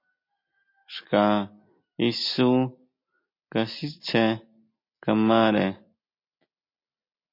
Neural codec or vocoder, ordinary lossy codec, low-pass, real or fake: none; MP3, 32 kbps; 5.4 kHz; real